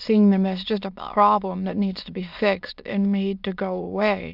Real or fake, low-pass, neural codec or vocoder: fake; 5.4 kHz; autoencoder, 22.05 kHz, a latent of 192 numbers a frame, VITS, trained on many speakers